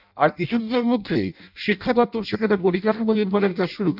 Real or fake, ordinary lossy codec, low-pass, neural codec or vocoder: fake; none; 5.4 kHz; codec, 16 kHz in and 24 kHz out, 0.6 kbps, FireRedTTS-2 codec